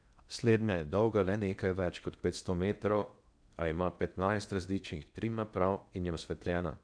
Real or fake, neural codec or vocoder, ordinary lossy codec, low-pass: fake; codec, 16 kHz in and 24 kHz out, 0.8 kbps, FocalCodec, streaming, 65536 codes; none; 9.9 kHz